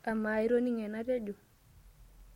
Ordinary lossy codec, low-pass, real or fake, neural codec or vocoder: MP3, 64 kbps; 19.8 kHz; real; none